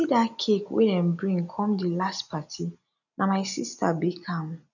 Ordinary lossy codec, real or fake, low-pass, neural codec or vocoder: none; real; 7.2 kHz; none